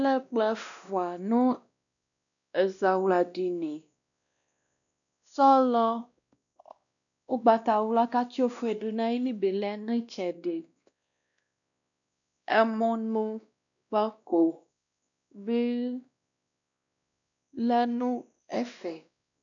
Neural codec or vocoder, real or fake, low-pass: codec, 16 kHz, 1 kbps, X-Codec, WavLM features, trained on Multilingual LibriSpeech; fake; 7.2 kHz